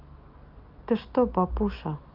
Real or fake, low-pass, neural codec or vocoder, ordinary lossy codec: real; 5.4 kHz; none; Opus, 24 kbps